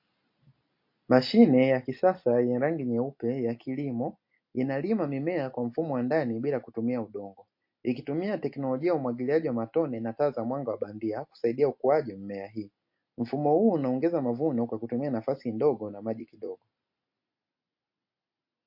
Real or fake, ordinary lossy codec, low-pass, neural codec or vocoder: real; MP3, 32 kbps; 5.4 kHz; none